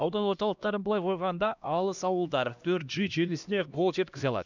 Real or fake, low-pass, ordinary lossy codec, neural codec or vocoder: fake; 7.2 kHz; none; codec, 16 kHz, 1 kbps, X-Codec, HuBERT features, trained on LibriSpeech